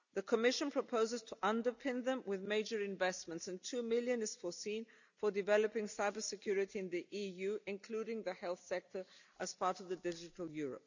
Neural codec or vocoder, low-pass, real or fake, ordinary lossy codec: none; 7.2 kHz; real; none